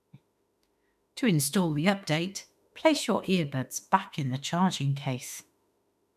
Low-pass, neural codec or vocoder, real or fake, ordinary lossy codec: 14.4 kHz; autoencoder, 48 kHz, 32 numbers a frame, DAC-VAE, trained on Japanese speech; fake; none